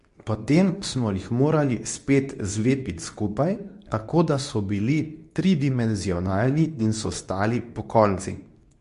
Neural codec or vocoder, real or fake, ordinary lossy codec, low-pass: codec, 24 kHz, 0.9 kbps, WavTokenizer, medium speech release version 2; fake; MP3, 64 kbps; 10.8 kHz